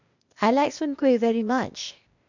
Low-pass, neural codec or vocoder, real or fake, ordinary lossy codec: 7.2 kHz; codec, 16 kHz, 0.8 kbps, ZipCodec; fake; none